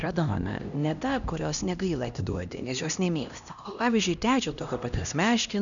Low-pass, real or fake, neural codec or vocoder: 7.2 kHz; fake; codec, 16 kHz, 1 kbps, X-Codec, HuBERT features, trained on LibriSpeech